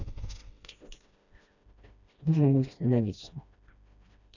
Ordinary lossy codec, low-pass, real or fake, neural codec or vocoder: none; 7.2 kHz; fake; codec, 16 kHz, 1 kbps, FreqCodec, smaller model